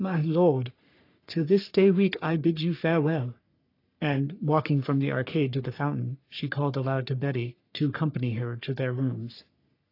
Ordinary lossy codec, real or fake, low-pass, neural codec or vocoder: AAC, 32 kbps; fake; 5.4 kHz; codec, 44.1 kHz, 3.4 kbps, Pupu-Codec